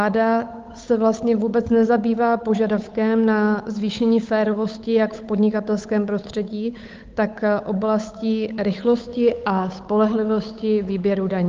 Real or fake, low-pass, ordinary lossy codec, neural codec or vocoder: fake; 7.2 kHz; Opus, 24 kbps; codec, 16 kHz, 8 kbps, FunCodec, trained on Chinese and English, 25 frames a second